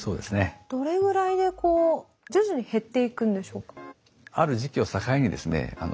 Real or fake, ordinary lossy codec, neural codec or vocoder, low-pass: real; none; none; none